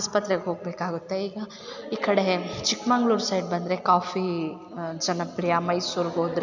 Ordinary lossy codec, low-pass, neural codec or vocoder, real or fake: none; 7.2 kHz; none; real